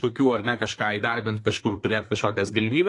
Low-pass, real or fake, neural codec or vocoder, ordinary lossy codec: 10.8 kHz; fake; codec, 24 kHz, 1 kbps, SNAC; AAC, 48 kbps